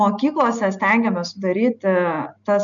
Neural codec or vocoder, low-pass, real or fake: none; 7.2 kHz; real